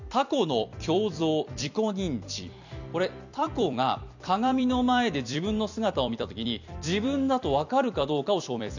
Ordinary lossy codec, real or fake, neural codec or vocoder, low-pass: none; fake; vocoder, 44.1 kHz, 128 mel bands every 256 samples, BigVGAN v2; 7.2 kHz